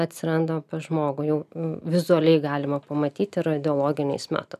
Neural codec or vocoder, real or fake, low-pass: none; real; 14.4 kHz